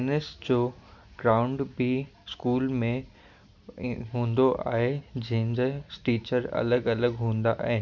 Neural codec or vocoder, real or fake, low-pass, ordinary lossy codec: none; real; 7.2 kHz; none